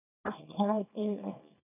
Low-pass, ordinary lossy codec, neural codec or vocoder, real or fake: 3.6 kHz; none; codec, 24 kHz, 0.9 kbps, WavTokenizer, small release; fake